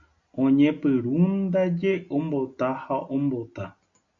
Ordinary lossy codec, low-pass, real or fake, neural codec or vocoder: AAC, 64 kbps; 7.2 kHz; real; none